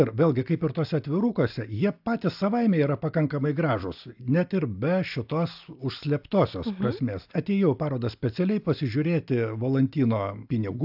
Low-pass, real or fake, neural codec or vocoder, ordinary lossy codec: 5.4 kHz; real; none; AAC, 48 kbps